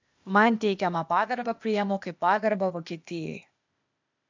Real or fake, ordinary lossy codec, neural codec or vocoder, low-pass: fake; MP3, 64 kbps; codec, 16 kHz, 0.8 kbps, ZipCodec; 7.2 kHz